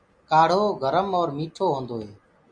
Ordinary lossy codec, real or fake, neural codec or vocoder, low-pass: MP3, 64 kbps; real; none; 9.9 kHz